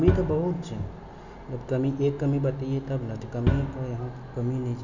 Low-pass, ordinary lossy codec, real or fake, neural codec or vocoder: 7.2 kHz; none; real; none